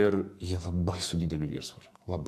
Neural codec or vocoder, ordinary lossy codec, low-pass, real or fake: codec, 44.1 kHz, 2.6 kbps, SNAC; AAC, 96 kbps; 14.4 kHz; fake